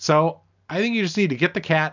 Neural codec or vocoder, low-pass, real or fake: none; 7.2 kHz; real